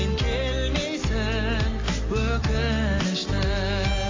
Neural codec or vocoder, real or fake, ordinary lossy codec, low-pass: none; real; AAC, 32 kbps; 7.2 kHz